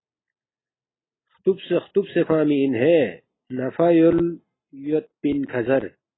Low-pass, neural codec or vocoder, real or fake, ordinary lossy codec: 7.2 kHz; none; real; AAC, 16 kbps